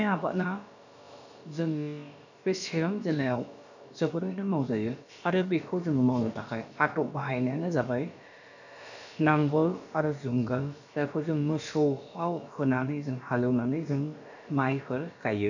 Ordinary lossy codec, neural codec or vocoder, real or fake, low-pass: none; codec, 16 kHz, about 1 kbps, DyCAST, with the encoder's durations; fake; 7.2 kHz